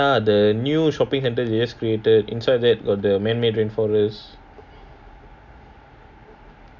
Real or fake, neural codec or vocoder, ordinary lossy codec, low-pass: real; none; none; 7.2 kHz